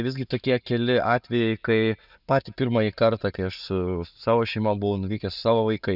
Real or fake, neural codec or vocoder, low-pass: real; none; 5.4 kHz